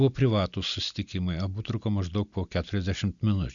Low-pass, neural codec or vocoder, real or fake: 7.2 kHz; none; real